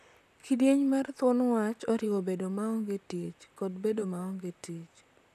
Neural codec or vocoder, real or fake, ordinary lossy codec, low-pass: vocoder, 44.1 kHz, 128 mel bands, Pupu-Vocoder; fake; none; 14.4 kHz